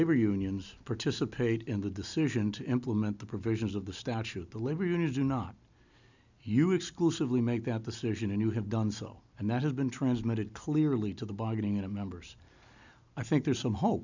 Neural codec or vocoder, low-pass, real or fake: none; 7.2 kHz; real